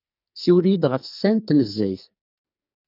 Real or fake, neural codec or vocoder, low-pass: fake; codec, 44.1 kHz, 2.6 kbps, SNAC; 5.4 kHz